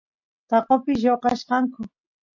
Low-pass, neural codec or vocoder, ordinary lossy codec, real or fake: 7.2 kHz; none; MP3, 48 kbps; real